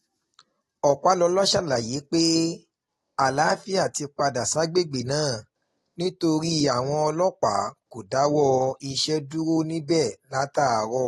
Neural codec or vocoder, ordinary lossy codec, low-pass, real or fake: none; AAC, 32 kbps; 19.8 kHz; real